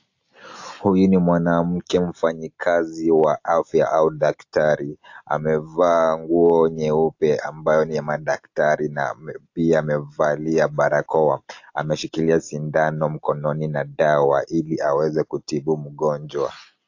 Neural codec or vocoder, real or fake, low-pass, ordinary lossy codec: none; real; 7.2 kHz; AAC, 48 kbps